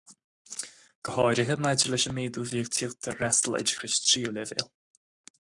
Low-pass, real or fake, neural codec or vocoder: 10.8 kHz; fake; codec, 44.1 kHz, 7.8 kbps, Pupu-Codec